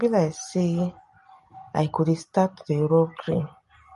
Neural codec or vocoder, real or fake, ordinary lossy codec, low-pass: none; real; MP3, 48 kbps; 14.4 kHz